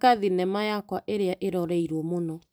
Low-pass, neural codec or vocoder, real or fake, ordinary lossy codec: none; vocoder, 44.1 kHz, 128 mel bands every 256 samples, BigVGAN v2; fake; none